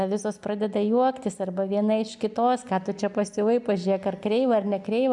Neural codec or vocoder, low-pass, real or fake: none; 10.8 kHz; real